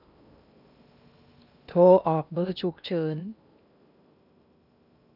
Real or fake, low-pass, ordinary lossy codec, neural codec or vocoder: fake; 5.4 kHz; none; codec, 16 kHz in and 24 kHz out, 0.8 kbps, FocalCodec, streaming, 65536 codes